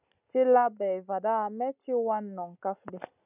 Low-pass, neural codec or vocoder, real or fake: 3.6 kHz; none; real